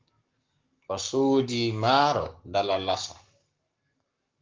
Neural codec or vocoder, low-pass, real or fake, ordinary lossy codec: codec, 44.1 kHz, 7.8 kbps, Pupu-Codec; 7.2 kHz; fake; Opus, 32 kbps